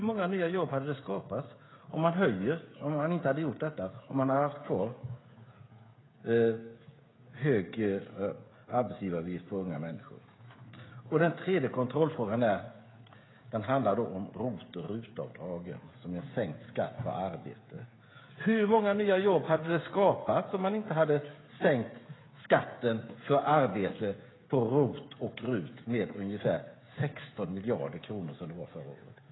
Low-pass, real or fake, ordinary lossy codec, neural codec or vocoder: 7.2 kHz; fake; AAC, 16 kbps; codec, 16 kHz, 16 kbps, FreqCodec, smaller model